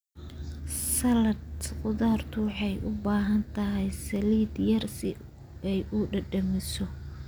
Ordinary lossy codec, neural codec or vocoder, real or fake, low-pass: none; none; real; none